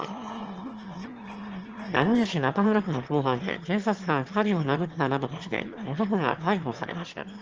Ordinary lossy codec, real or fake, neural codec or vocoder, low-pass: Opus, 16 kbps; fake; autoencoder, 22.05 kHz, a latent of 192 numbers a frame, VITS, trained on one speaker; 7.2 kHz